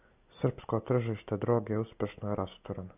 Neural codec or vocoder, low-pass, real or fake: none; 3.6 kHz; real